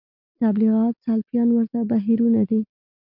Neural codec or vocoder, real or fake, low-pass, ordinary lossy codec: none; real; 5.4 kHz; MP3, 48 kbps